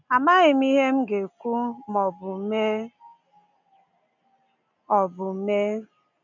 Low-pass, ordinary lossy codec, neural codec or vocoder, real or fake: 7.2 kHz; none; none; real